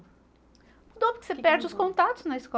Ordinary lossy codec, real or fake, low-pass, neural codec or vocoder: none; real; none; none